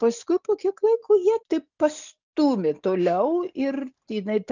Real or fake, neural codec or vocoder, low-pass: real; none; 7.2 kHz